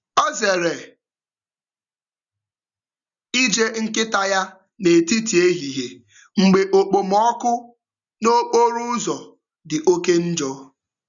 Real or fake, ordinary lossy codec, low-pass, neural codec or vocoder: real; none; 7.2 kHz; none